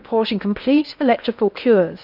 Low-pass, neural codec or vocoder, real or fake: 5.4 kHz; codec, 16 kHz in and 24 kHz out, 0.6 kbps, FocalCodec, streaming, 2048 codes; fake